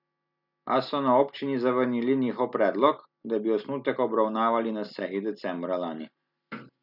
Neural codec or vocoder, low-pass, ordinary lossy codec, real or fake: none; 5.4 kHz; none; real